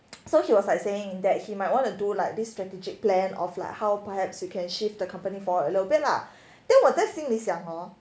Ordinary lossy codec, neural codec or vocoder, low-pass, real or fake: none; none; none; real